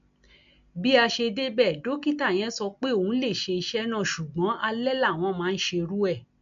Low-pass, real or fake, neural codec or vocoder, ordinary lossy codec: 7.2 kHz; real; none; MP3, 64 kbps